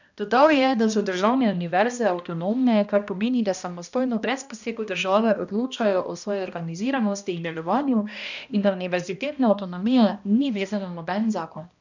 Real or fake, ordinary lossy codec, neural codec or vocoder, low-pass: fake; none; codec, 16 kHz, 1 kbps, X-Codec, HuBERT features, trained on balanced general audio; 7.2 kHz